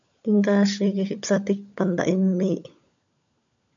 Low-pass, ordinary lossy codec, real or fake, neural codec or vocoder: 7.2 kHz; AAC, 64 kbps; fake; codec, 16 kHz, 16 kbps, FunCodec, trained on LibriTTS, 50 frames a second